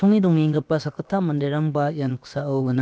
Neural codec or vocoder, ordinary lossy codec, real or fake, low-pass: codec, 16 kHz, about 1 kbps, DyCAST, with the encoder's durations; none; fake; none